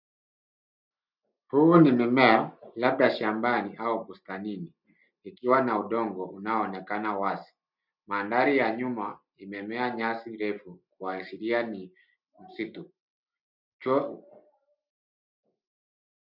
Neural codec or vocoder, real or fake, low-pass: none; real; 5.4 kHz